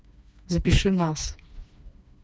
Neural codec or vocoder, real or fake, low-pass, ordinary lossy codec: codec, 16 kHz, 2 kbps, FreqCodec, smaller model; fake; none; none